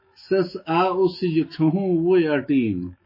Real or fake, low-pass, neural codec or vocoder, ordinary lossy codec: fake; 5.4 kHz; autoencoder, 48 kHz, 128 numbers a frame, DAC-VAE, trained on Japanese speech; MP3, 24 kbps